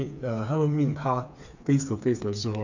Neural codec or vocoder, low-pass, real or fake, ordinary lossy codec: codec, 16 kHz, 4 kbps, FreqCodec, smaller model; 7.2 kHz; fake; none